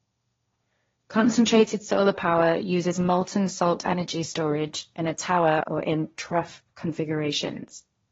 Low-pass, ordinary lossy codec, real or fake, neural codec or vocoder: 7.2 kHz; AAC, 24 kbps; fake; codec, 16 kHz, 1.1 kbps, Voila-Tokenizer